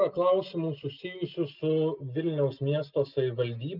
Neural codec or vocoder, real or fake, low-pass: none; real; 5.4 kHz